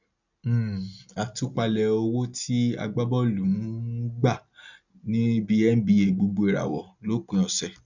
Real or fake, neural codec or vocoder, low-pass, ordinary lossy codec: real; none; 7.2 kHz; none